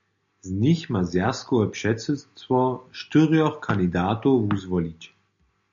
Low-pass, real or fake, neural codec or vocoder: 7.2 kHz; real; none